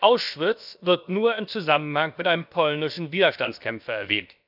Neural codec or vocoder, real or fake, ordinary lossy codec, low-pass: codec, 16 kHz, about 1 kbps, DyCAST, with the encoder's durations; fake; none; 5.4 kHz